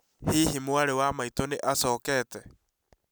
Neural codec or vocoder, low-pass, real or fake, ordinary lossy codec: none; none; real; none